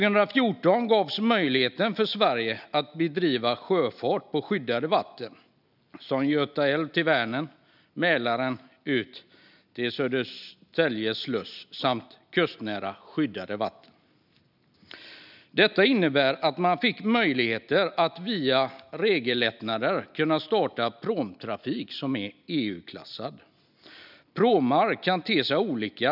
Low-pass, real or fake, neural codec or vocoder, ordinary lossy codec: 5.4 kHz; real; none; none